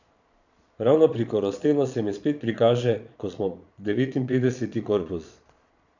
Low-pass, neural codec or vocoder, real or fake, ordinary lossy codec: 7.2 kHz; vocoder, 22.05 kHz, 80 mel bands, Vocos; fake; none